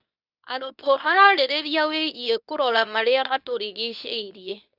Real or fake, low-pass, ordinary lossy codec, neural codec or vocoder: fake; 5.4 kHz; none; codec, 24 kHz, 0.9 kbps, WavTokenizer, medium speech release version 2